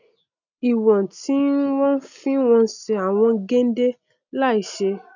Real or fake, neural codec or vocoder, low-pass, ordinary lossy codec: real; none; 7.2 kHz; none